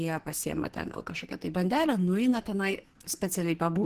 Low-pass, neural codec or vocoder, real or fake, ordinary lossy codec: 14.4 kHz; codec, 44.1 kHz, 2.6 kbps, SNAC; fake; Opus, 16 kbps